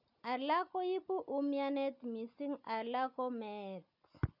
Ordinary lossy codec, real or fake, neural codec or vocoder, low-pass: none; real; none; 5.4 kHz